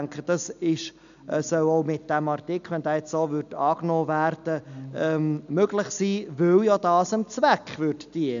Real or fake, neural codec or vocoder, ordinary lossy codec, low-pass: real; none; none; 7.2 kHz